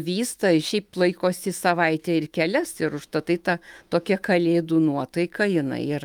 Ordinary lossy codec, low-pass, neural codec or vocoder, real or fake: Opus, 32 kbps; 19.8 kHz; autoencoder, 48 kHz, 128 numbers a frame, DAC-VAE, trained on Japanese speech; fake